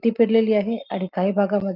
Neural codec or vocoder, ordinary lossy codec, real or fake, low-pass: none; Opus, 24 kbps; real; 5.4 kHz